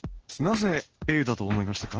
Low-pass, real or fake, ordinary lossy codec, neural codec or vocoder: 7.2 kHz; fake; Opus, 16 kbps; codec, 16 kHz in and 24 kHz out, 1 kbps, XY-Tokenizer